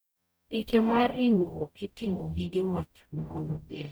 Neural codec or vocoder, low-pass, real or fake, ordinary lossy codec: codec, 44.1 kHz, 0.9 kbps, DAC; none; fake; none